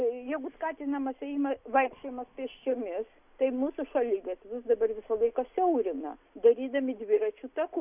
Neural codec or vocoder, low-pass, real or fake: none; 3.6 kHz; real